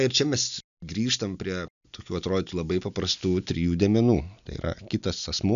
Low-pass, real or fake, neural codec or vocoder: 7.2 kHz; real; none